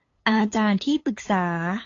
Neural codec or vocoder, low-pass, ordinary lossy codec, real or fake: codec, 16 kHz, 8 kbps, FunCodec, trained on LibriTTS, 25 frames a second; 7.2 kHz; AAC, 32 kbps; fake